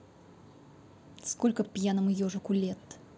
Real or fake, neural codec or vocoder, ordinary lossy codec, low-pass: real; none; none; none